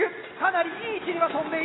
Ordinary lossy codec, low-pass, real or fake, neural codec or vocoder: AAC, 16 kbps; 7.2 kHz; real; none